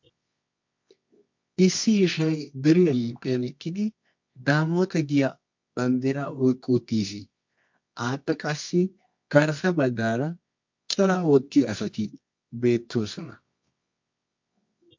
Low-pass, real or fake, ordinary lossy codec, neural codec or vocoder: 7.2 kHz; fake; MP3, 48 kbps; codec, 24 kHz, 0.9 kbps, WavTokenizer, medium music audio release